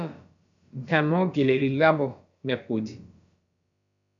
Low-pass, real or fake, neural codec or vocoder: 7.2 kHz; fake; codec, 16 kHz, about 1 kbps, DyCAST, with the encoder's durations